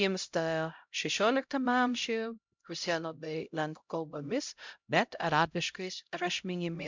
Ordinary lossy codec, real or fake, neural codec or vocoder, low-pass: MP3, 64 kbps; fake; codec, 16 kHz, 0.5 kbps, X-Codec, HuBERT features, trained on LibriSpeech; 7.2 kHz